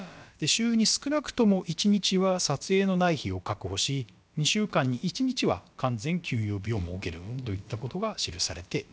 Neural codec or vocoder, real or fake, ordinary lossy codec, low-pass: codec, 16 kHz, about 1 kbps, DyCAST, with the encoder's durations; fake; none; none